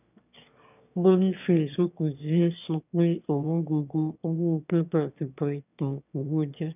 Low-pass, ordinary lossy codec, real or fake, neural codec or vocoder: 3.6 kHz; none; fake; autoencoder, 22.05 kHz, a latent of 192 numbers a frame, VITS, trained on one speaker